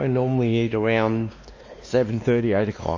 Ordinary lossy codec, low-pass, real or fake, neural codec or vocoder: MP3, 32 kbps; 7.2 kHz; fake; codec, 16 kHz, 2 kbps, X-Codec, WavLM features, trained on Multilingual LibriSpeech